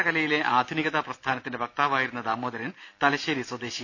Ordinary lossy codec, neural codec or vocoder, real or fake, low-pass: none; none; real; none